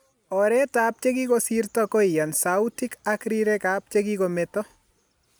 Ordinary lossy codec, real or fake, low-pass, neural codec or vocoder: none; real; none; none